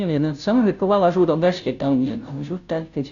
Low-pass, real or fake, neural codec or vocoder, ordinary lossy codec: 7.2 kHz; fake; codec, 16 kHz, 0.5 kbps, FunCodec, trained on Chinese and English, 25 frames a second; none